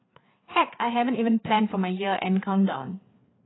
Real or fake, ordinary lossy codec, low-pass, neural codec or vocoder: fake; AAC, 16 kbps; 7.2 kHz; codec, 16 kHz, 4 kbps, FreqCodec, larger model